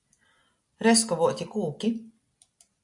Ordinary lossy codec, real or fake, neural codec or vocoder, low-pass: AAC, 64 kbps; real; none; 10.8 kHz